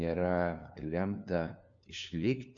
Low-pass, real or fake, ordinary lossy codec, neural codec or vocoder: 7.2 kHz; fake; AAC, 64 kbps; codec, 16 kHz, 2 kbps, FunCodec, trained on LibriTTS, 25 frames a second